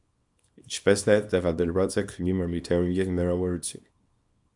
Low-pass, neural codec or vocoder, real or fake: 10.8 kHz; codec, 24 kHz, 0.9 kbps, WavTokenizer, small release; fake